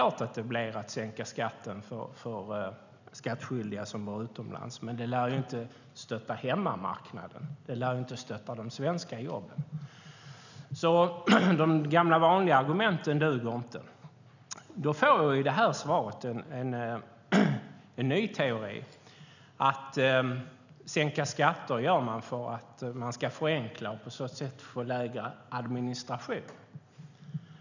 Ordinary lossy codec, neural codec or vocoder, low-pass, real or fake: none; none; 7.2 kHz; real